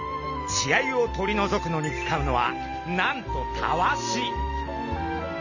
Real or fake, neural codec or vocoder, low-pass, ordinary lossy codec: real; none; 7.2 kHz; none